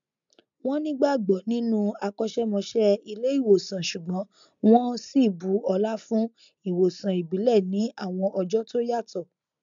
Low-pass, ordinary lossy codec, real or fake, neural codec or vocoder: 7.2 kHz; MP3, 64 kbps; real; none